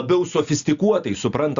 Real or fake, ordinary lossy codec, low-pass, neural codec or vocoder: real; Opus, 64 kbps; 7.2 kHz; none